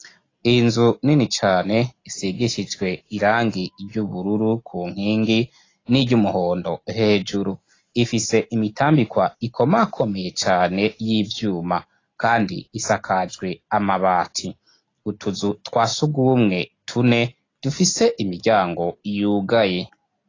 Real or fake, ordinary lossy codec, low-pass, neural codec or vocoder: real; AAC, 32 kbps; 7.2 kHz; none